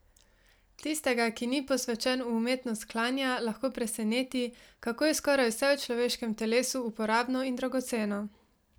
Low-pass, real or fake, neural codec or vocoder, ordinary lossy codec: none; real; none; none